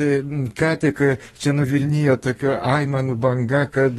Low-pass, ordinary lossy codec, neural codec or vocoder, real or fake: 14.4 kHz; AAC, 32 kbps; codec, 32 kHz, 1.9 kbps, SNAC; fake